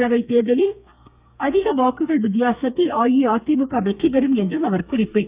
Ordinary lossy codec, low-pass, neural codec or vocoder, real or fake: Opus, 64 kbps; 3.6 kHz; codec, 32 kHz, 1.9 kbps, SNAC; fake